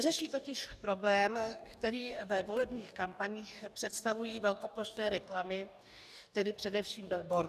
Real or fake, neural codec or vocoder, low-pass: fake; codec, 44.1 kHz, 2.6 kbps, DAC; 14.4 kHz